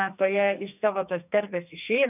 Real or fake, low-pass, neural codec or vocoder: fake; 3.6 kHz; codec, 44.1 kHz, 2.6 kbps, SNAC